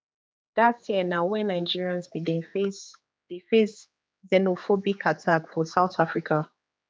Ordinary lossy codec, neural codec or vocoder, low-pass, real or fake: none; codec, 16 kHz, 4 kbps, X-Codec, HuBERT features, trained on general audio; none; fake